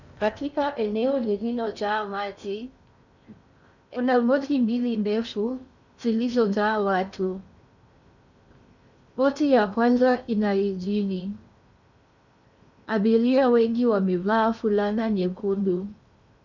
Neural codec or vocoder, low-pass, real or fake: codec, 16 kHz in and 24 kHz out, 0.6 kbps, FocalCodec, streaming, 4096 codes; 7.2 kHz; fake